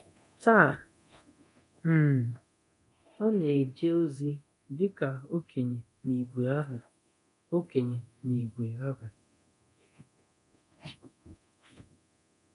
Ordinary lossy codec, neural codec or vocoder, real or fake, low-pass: none; codec, 24 kHz, 0.9 kbps, DualCodec; fake; 10.8 kHz